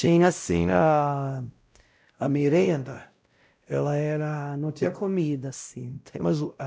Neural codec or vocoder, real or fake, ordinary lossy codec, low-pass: codec, 16 kHz, 0.5 kbps, X-Codec, WavLM features, trained on Multilingual LibriSpeech; fake; none; none